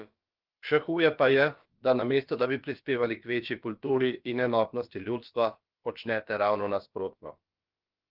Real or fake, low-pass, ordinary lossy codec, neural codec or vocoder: fake; 5.4 kHz; Opus, 32 kbps; codec, 16 kHz, about 1 kbps, DyCAST, with the encoder's durations